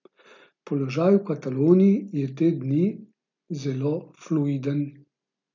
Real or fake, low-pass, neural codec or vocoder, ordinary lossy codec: real; 7.2 kHz; none; none